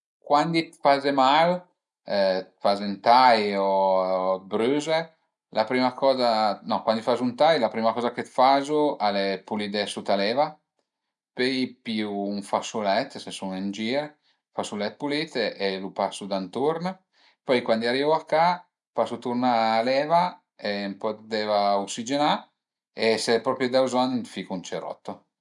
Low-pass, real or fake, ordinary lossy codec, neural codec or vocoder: 10.8 kHz; real; none; none